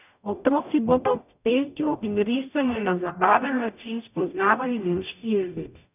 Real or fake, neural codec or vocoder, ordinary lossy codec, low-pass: fake; codec, 44.1 kHz, 0.9 kbps, DAC; none; 3.6 kHz